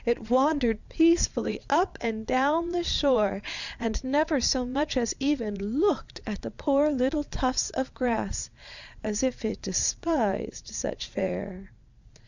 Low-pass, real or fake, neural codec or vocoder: 7.2 kHz; fake; vocoder, 22.05 kHz, 80 mel bands, Vocos